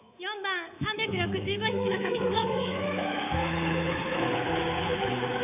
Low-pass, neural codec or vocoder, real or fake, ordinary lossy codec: 3.6 kHz; codec, 24 kHz, 3.1 kbps, DualCodec; fake; AAC, 32 kbps